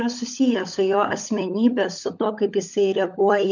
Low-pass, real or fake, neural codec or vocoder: 7.2 kHz; fake; codec, 16 kHz, 16 kbps, FunCodec, trained on LibriTTS, 50 frames a second